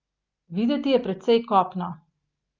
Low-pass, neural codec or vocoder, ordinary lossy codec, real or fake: 7.2 kHz; none; Opus, 24 kbps; real